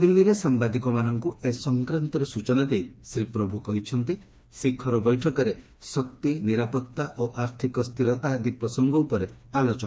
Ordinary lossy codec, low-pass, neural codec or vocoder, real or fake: none; none; codec, 16 kHz, 2 kbps, FreqCodec, smaller model; fake